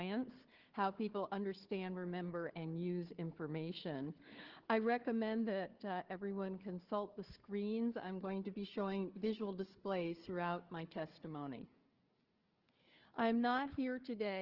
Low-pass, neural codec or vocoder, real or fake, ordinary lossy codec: 5.4 kHz; codec, 16 kHz, 4 kbps, FunCodec, trained on Chinese and English, 50 frames a second; fake; Opus, 16 kbps